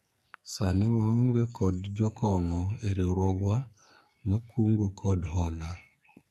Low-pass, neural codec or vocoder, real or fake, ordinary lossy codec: 14.4 kHz; codec, 44.1 kHz, 2.6 kbps, SNAC; fake; MP3, 64 kbps